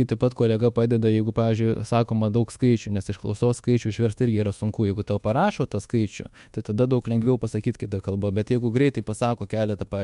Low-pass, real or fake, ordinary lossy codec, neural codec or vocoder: 10.8 kHz; fake; MP3, 64 kbps; codec, 24 kHz, 1.2 kbps, DualCodec